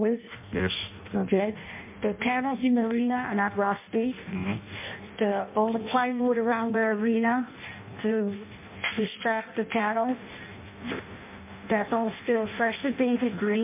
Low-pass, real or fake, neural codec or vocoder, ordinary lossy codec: 3.6 kHz; fake; codec, 16 kHz in and 24 kHz out, 0.6 kbps, FireRedTTS-2 codec; MP3, 32 kbps